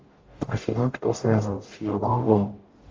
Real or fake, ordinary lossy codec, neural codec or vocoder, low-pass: fake; Opus, 32 kbps; codec, 44.1 kHz, 0.9 kbps, DAC; 7.2 kHz